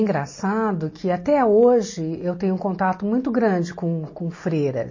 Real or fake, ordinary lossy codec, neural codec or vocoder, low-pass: real; MP3, 32 kbps; none; 7.2 kHz